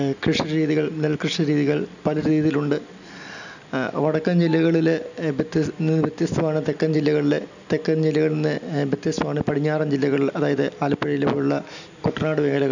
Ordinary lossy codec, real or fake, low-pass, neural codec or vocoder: none; real; 7.2 kHz; none